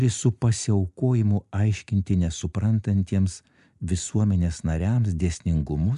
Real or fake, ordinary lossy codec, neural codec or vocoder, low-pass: real; AAC, 96 kbps; none; 10.8 kHz